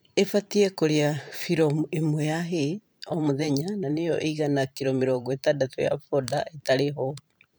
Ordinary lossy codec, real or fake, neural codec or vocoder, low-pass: none; fake; vocoder, 44.1 kHz, 128 mel bands every 512 samples, BigVGAN v2; none